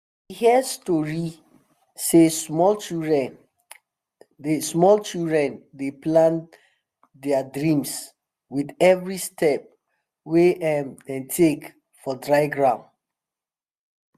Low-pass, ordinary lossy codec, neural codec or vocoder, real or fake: 14.4 kHz; Opus, 64 kbps; none; real